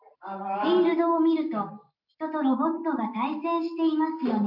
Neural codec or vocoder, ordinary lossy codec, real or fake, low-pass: none; none; real; 5.4 kHz